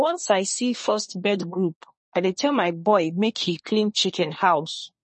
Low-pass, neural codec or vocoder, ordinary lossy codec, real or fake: 10.8 kHz; codec, 24 kHz, 1 kbps, SNAC; MP3, 32 kbps; fake